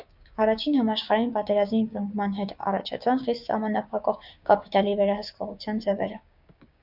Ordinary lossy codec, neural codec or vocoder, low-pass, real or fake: Opus, 64 kbps; codec, 16 kHz, 6 kbps, DAC; 5.4 kHz; fake